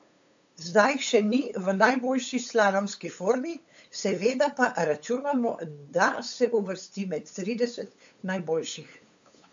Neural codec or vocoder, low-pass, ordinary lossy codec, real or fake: codec, 16 kHz, 8 kbps, FunCodec, trained on LibriTTS, 25 frames a second; 7.2 kHz; AAC, 64 kbps; fake